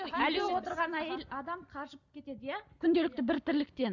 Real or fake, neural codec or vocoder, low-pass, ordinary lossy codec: real; none; 7.2 kHz; none